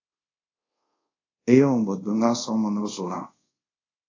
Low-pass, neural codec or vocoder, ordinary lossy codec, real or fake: 7.2 kHz; codec, 24 kHz, 0.5 kbps, DualCodec; AAC, 32 kbps; fake